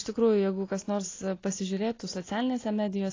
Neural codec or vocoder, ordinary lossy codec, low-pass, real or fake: none; AAC, 32 kbps; 7.2 kHz; real